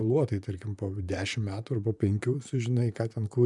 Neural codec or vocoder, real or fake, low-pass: vocoder, 44.1 kHz, 128 mel bands, Pupu-Vocoder; fake; 10.8 kHz